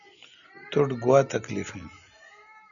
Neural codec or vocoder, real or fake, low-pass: none; real; 7.2 kHz